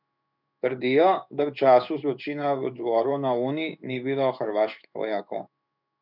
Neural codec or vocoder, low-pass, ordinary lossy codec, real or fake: codec, 16 kHz in and 24 kHz out, 1 kbps, XY-Tokenizer; 5.4 kHz; none; fake